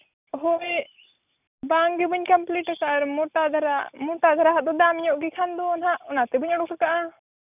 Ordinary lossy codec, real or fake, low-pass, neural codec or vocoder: none; real; 3.6 kHz; none